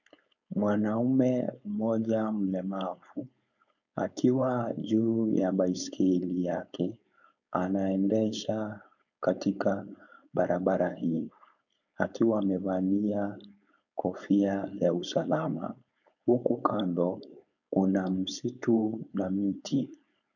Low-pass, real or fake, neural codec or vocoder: 7.2 kHz; fake; codec, 16 kHz, 4.8 kbps, FACodec